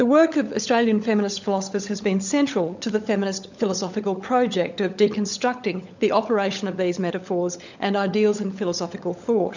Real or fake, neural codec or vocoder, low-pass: fake; codec, 16 kHz, 16 kbps, FunCodec, trained on LibriTTS, 50 frames a second; 7.2 kHz